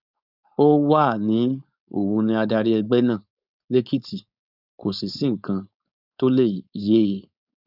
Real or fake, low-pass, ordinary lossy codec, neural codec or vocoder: fake; 5.4 kHz; none; codec, 16 kHz, 4.8 kbps, FACodec